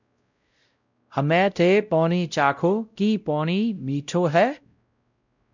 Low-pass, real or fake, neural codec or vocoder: 7.2 kHz; fake; codec, 16 kHz, 0.5 kbps, X-Codec, WavLM features, trained on Multilingual LibriSpeech